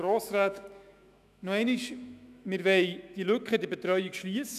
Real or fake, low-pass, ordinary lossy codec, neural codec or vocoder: fake; 14.4 kHz; none; autoencoder, 48 kHz, 128 numbers a frame, DAC-VAE, trained on Japanese speech